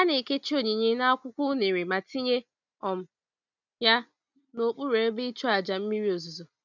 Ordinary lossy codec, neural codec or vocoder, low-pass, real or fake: none; none; 7.2 kHz; real